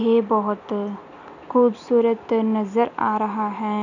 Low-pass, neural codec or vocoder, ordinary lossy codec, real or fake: 7.2 kHz; none; none; real